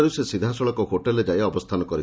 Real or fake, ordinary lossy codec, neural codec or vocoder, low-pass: real; none; none; none